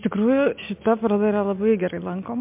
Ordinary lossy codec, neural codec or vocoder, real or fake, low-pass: MP3, 32 kbps; none; real; 3.6 kHz